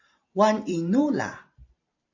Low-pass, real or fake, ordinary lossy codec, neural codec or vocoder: 7.2 kHz; fake; AAC, 48 kbps; vocoder, 44.1 kHz, 128 mel bands every 512 samples, BigVGAN v2